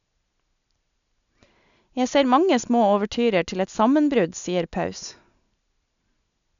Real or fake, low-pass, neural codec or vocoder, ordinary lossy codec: real; 7.2 kHz; none; none